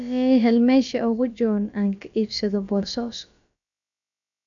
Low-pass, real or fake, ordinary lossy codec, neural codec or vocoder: 7.2 kHz; fake; none; codec, 16 kHz, about 1 kbps, DyCAST, with the encoder's durations